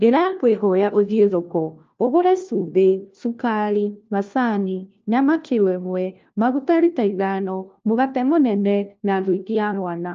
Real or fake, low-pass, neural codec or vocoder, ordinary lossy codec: fake; 7.2 kHz; codec, 16 kHz, 1 kbps, FunCodec, trained on LibriTTS, 50 frames a second; Opus, 24 kbps